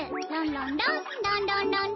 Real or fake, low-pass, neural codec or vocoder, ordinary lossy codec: real; 7.2 kHz; none; MP3, 24 kbps